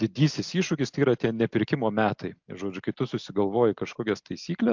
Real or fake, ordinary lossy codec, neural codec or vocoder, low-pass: real; MP3, 64 kbps; none; 7.2 kHz